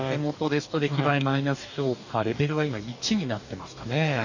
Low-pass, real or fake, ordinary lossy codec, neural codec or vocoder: 7.2 kHz; fake; none; codec, 44.1 kHz, 2.6 kbps, DAC